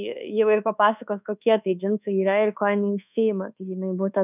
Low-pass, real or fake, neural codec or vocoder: 3.6 kHz; fake; codec, 24 kHz, 1.2 kbps, DualCodec